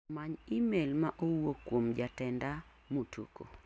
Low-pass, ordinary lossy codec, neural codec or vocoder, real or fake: none; none; none; real